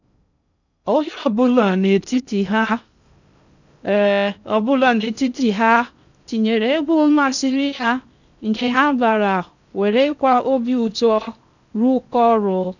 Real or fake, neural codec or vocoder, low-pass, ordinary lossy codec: fake; codec, 16 kHz in and 24 kHz out, 0.6 kbps, FocalCodec, streaming, 2048 codes; 7.2 kHz; none